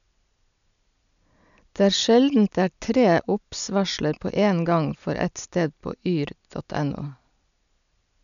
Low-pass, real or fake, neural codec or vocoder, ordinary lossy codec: 7.2 kHz; real; none; none